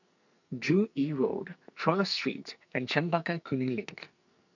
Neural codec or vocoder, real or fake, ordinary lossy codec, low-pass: codec, 44.1 kHz, 2.6 kbps, SNAC; fake; none; 7.2 kHz